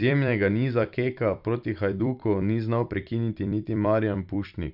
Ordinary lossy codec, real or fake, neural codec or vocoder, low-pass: none; fake; vocoder, 44.1 kHz, 128 mel bands every 256 samples, BigVGAN v2; 5.4 kHz